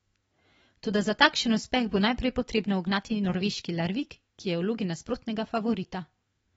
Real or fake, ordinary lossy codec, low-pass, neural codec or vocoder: fake; AAC, 24 kbps; 19.8 kHz; autoencoder, 48 kHz, 128 numbers a frame, DAC-VAE, trained on Japanese speech